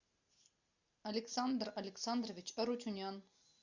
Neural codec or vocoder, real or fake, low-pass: none; real; 7.2 kHz